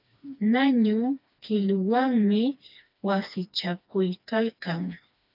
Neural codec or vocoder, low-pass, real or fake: codec, 16 kHz, 2 kbps, FreqCodec, smaller model; 5.4 kHz; fake